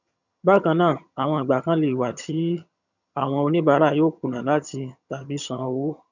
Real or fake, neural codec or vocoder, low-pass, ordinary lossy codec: fake; vocoder, 22.05 kHz, 80 mel bands, HiFi-GAN; 7.2 kHz; none